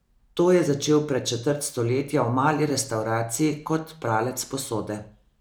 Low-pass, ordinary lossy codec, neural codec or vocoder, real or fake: none; none; none; real